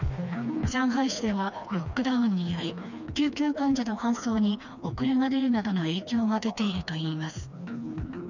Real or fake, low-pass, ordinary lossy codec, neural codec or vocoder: fake; 7.2 kHz; none; codec, 16 kHz, 2 kbps, FreqCodec, smaller model